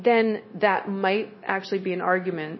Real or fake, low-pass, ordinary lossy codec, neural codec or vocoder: fake; 7.2 kHz; MP3, 24 kbps; codec, 16 kHz, 0.9 kbps, LongCat-Audio-Codec